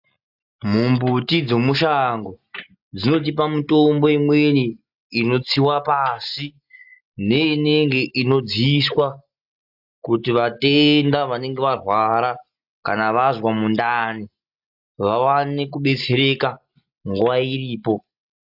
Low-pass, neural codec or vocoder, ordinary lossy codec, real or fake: 5.4 kHz; none; AAC, 48 kbps; real